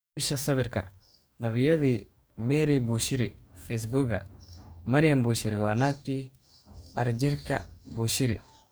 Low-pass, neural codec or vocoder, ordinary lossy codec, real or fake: none; codec, 44.1 kHz, 2.6 kbps, DAC; none; fake